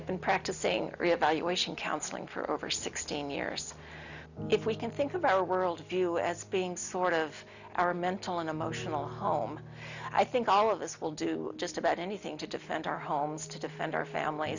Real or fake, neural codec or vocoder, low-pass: real; none; 7.2 kHz